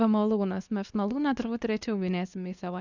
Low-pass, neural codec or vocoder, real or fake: 7.2 kHz; codec, 24 kHz, 0.9 kbps, WavTokenizer, medium speech release version 2; fake